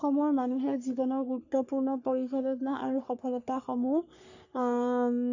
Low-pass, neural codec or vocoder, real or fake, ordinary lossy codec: 7.2 kHz; codec, 44.1 kHz, 3.4 kbps, Pupu-Codec; fake; none